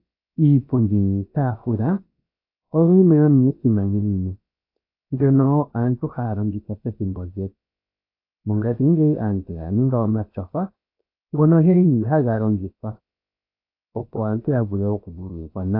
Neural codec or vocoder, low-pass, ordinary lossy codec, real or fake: codec, 16 kHz, about 1 kbps, DyCAST, with the encoder's durations; 5.4 kHz; AAC, 32 kbps; fake